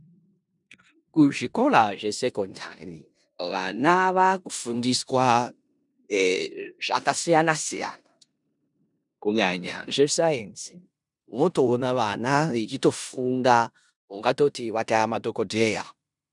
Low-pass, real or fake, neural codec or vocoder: 10.8 kHz; fake; codec, 16 kHz in and 24 kHz out, 0.9 kbps, LongCat-Audio-Codec, four codebook decoder